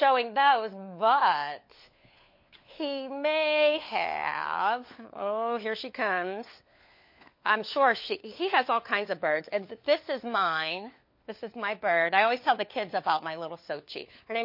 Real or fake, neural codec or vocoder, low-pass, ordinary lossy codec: fake; codec, 16 kHz, 4 kbps, FunCodec, trained on LibriTTS, 50 frames a second; 5.4 kHz; MP3, 32 kbps